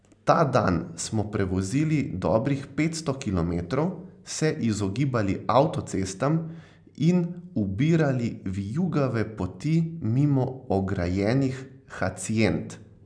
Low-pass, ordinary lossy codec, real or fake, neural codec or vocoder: 9.9 kHz; none; real; none